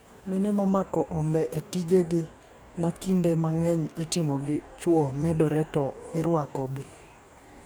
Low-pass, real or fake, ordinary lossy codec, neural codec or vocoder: none; fake; none; codec, 44.1 kHz, 2.6 kbps, DAC